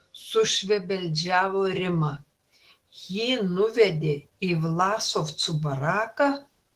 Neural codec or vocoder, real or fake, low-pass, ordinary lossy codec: autoencoder, 48 kHz, 128 numbers a frame, DAC-VAE, trained on Japanese speech; fake; 14.4 kHz; Opus, 16 kbps